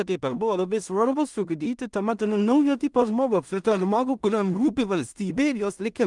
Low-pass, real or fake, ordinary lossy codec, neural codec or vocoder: 10.8 kHz; fake; Opus, 32 kbps; codec, 16 kHz in and 24 kHz out, 0.4 kbps, LongCat-Audio-Codec, two codebook decoder